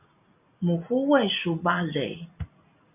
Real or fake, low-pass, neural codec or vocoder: real; 3.6 kHz; none